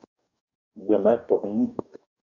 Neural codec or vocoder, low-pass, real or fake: codec, 44.1 kHz, 2.6 kbps, DAC; 7.2 kHz; fake